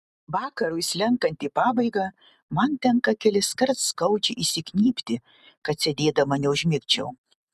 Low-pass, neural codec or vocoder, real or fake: 14.4 kHz; none; real